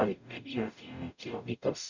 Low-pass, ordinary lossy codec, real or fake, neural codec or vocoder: 7.2 kHz; none; fake; codec, 44.1 kHz, 0.9 kbps, DAC